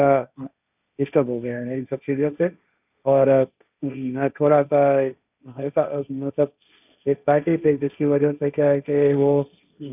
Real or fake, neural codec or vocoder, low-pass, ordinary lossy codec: fake; codec, 16 kHz, 1.1 kbps, Voila-Tokenizer; 3.6 kHz; none